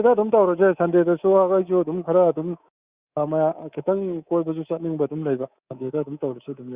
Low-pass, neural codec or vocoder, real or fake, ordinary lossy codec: 3.6 kHz; codec, 24 kHz, 3.1 kbps, DualCodec; fake; Opus, 64 kbps